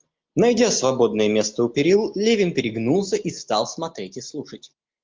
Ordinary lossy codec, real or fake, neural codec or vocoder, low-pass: Opus, 24 kbps; real; none; 7.2 kHz